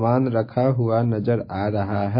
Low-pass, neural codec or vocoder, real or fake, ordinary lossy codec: 5.4 kHz; none; real; MP3, 24 kbps